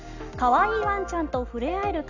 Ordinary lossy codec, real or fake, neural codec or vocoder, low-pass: none; real; none; 7.2 kHz